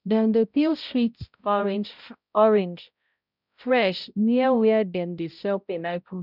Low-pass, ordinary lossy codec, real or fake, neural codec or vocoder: 5.4 kHz; none; fake; codec, 16 kHz, 0.5 kbps, X-Codec, HuBERT features, trained on balanced general audio